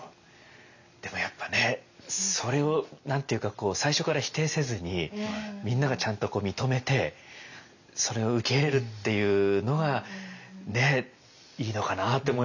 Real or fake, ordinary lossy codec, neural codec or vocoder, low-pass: real; none; none; 7.2 kHz